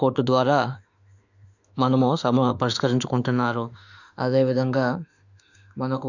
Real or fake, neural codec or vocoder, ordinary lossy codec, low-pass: fake; autoencoder, 48 kHz, 32 numbers a frame, DAC-VAE, trained on Japanese speech; none; 7.2 kHz